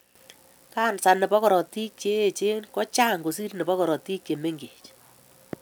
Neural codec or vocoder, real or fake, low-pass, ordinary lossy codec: none; real; none; none